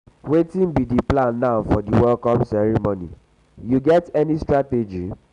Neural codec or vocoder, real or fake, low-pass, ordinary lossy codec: none; real; 10.8 kHz; none